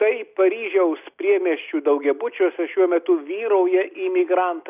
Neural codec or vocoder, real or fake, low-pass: none; real; 3.6 kHz